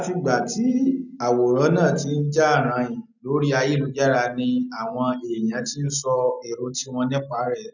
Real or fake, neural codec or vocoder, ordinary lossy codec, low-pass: real; none; none; 7.2 kHz